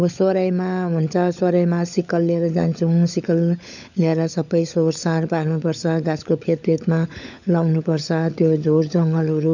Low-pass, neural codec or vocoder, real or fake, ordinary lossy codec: 7.2 kHz; codec, 16 kHz, 16 kbps, FunCodec, trained on LibriTTS, 50 frames a second; fake; none